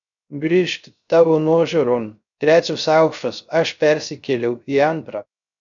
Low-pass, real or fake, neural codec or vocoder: 7.2 kHz; fake; codec, 16 kHz, 0.3 kbps, FocalCodec